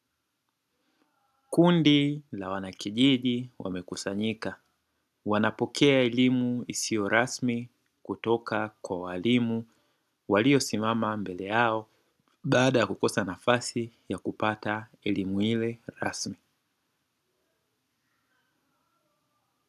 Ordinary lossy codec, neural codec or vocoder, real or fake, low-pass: AAC, 96 kbps; none; real; 14.4 kHz